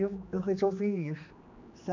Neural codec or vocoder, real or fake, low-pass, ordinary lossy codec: codec, 16 kHz, 2 kbps, X-Codec, HuBERT features, trained on general audio; fake; 7.2 kHz; MP3, 64 kbps